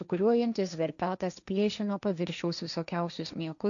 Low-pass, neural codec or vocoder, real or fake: 7.2 kHz; codec, 16 kHz, 1.1 kbps, Voila-Tokenizer; fake